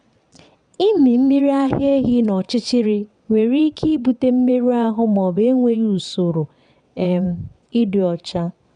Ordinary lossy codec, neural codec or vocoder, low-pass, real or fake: none; vocoder, 22.05 kHz, 80 mel bands, WaveNeXt; 9.9 kHz; fake